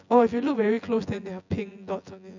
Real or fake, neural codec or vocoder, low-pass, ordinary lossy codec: fake; vocoder, 24 kHz, 100 mel bands, Vocos; 7.2 kHz; none